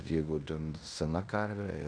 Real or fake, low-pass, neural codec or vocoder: fake; 9.9 kHz; codec, 16 kHz in and 24 kHz out, 0.9 kbps, LongCat-Audio-Codec, fine tuned four codebook decoder